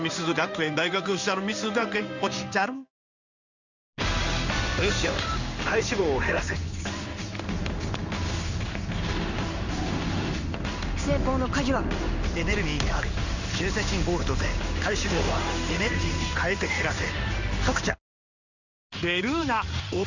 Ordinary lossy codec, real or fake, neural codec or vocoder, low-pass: Opus, 64 kbps; fake; codec, 16 kHz in and 24 kHz out, 1 kbps, XY-Tokenizer; 7.2 kHz